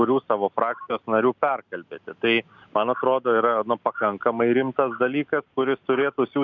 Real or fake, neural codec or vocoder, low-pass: real; none; 7.2 kHz